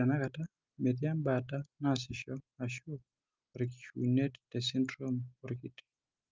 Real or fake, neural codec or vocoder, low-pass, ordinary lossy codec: real; none; 7.2 kHz; Opus, 32 kbps